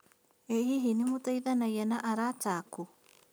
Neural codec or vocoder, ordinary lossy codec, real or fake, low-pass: none; none; real; none